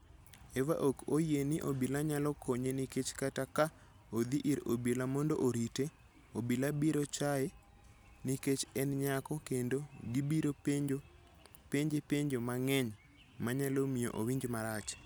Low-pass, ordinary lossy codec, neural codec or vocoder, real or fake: none; none; none; real